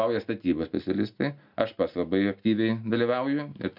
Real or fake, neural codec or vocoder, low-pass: real; none; 5.4 kHz